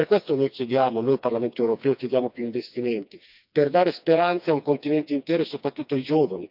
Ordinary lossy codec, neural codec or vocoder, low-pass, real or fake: none; codec, 16 kHz, 2 kbps, FreqCodec, smaller model; 5.4 kHz; fake